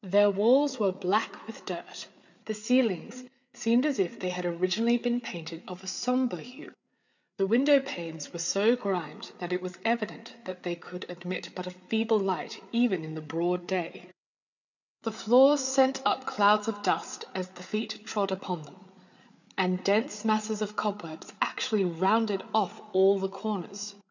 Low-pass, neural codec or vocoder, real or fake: 7.2 kHz; codec, 16 kHz, 8 kbps, FreqCodec, larger model; fake